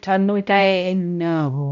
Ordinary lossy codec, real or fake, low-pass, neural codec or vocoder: none; fake; 7.2 kHz; codec, 16 kHz, 0.5 kbps, X-Codec, HuBERT features, trained on balanced general audio